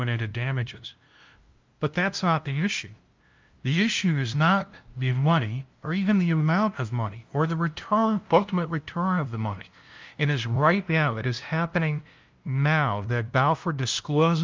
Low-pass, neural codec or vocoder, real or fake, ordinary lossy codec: 7.2 kHz; codec, 16 kHz, 0.5 kbps, FunCodec, trained on LibriTTS, 25 frames a second; fake; Opus, 24 kbps